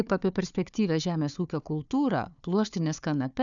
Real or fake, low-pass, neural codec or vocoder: fake; 7.2 kHz; codec, 16 kHz, 4 kbps, FunCodec, trained on Chinese and English, 50 frames a second